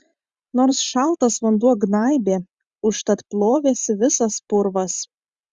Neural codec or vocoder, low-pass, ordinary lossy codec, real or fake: vocoder, 44.1 kHz, 128 mel bands every 512 samples, BigVGAN v2; 10.8 kHz; Opus, 64 kbps; fake